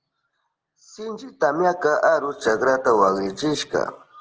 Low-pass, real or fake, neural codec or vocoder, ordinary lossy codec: 7.2 kHz; real; none; Opus, 16 kbps